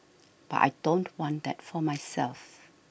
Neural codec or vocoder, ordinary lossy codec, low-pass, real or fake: none; none; none; real